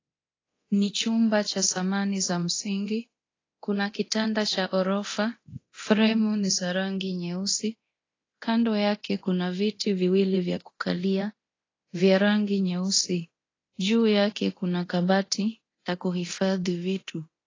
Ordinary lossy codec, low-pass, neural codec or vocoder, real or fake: AAC, 32 kbps; 7.2 kHz; codec, 24 kHz, 0.9 kbps, DualCodec; fake